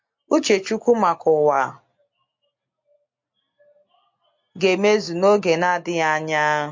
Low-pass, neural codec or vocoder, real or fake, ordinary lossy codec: 7.2 kHz; none; real; MP3, 48 kbps